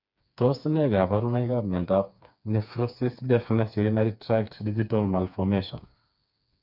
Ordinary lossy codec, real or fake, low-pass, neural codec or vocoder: none; fake; 5.4 kHz; codec, 16 kHz, 4 kbps, FreqCodec, smaller model